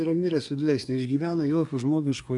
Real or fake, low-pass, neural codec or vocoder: fake; 10.8 kHz; codec, 24 kHz, 1 kbps, SNAC